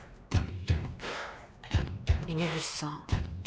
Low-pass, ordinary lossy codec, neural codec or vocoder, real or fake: none; none; codec, 16 kHz, 2 kbps, X-Codec, WavLM features, trained on Multilingual LibriSpeech; fake